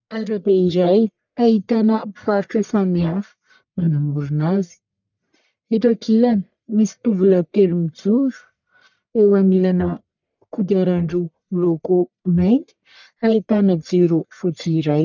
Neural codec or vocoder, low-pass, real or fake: codec, 44.1 kHz, 1.7 kbps, Pupu-Codec; 7.2 kHz; fake